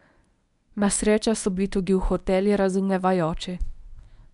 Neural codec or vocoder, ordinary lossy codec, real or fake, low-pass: codec, 24 kHz, 0.9 kbps, WavTokenizer, medium speech release version 1; none; fake; 10.8 kHz